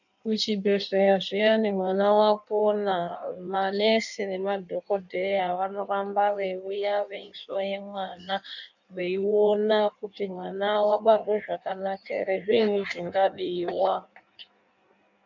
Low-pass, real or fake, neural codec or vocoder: 7.2 kHz; fake; codec, 16 kHz in and 24 kHz out, 1.1 kbps, FireRedTTS-2 codec